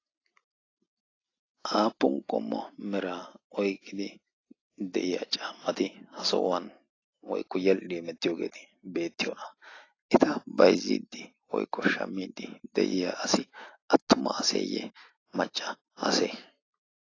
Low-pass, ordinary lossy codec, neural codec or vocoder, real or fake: 7.2 kHz; AAC, 32 kbps; none; real